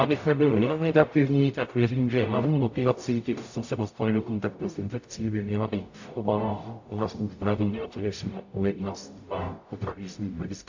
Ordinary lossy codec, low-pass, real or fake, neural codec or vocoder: AAC, 48 kbps; 7.2 kHz; fake; codec, 44.1 kHz, 0.9 kbps, DAC